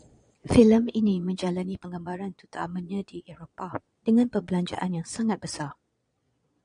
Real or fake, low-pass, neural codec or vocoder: fake; 9.9 kHz; vocoder, 22.05 kHz, 80 mel bands, Vocos